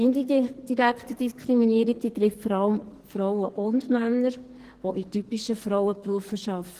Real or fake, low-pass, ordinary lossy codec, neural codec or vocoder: fake; 14.4 kHz; Opus, 16 kbps; codec, 44.1 kHz, 2.6 kbps, SNAC